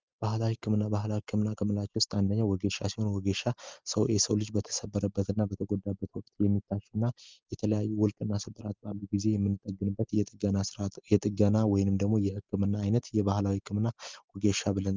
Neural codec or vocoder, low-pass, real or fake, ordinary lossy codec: none; 7.2 kHz; real; Opus, 24 kbps